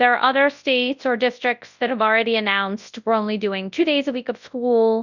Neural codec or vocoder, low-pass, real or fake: codec, 24 kHz, 0.9 kbps, WavTokenizer, large speech release; 7.2 kHz; fake